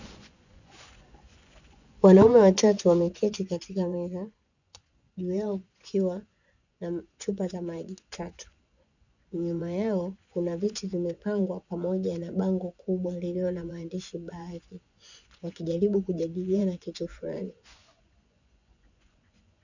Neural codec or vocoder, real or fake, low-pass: vocoder, 24 kHz, 100 mel bands, Vocos; fake; 7.2 kHz